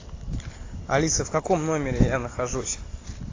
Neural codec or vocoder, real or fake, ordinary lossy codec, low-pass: none; real; AAC, 32 kbps; 7.2 kHz